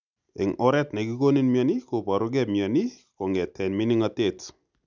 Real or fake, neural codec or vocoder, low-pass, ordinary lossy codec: real; none; 7.2 kHz; none